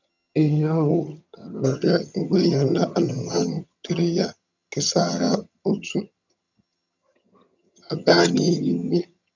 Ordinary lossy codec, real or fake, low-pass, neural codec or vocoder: none; fake; 7.2 kHz; vocoder, 22.05 kHz, 80 mel bands, HiFi-GAN